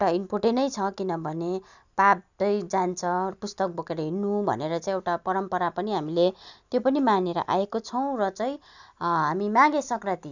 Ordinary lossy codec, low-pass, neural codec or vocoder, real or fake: none; 7.2 kHz; none; real